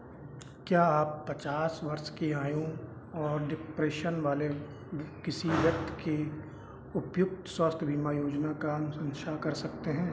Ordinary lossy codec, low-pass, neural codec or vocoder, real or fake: none; none; none; real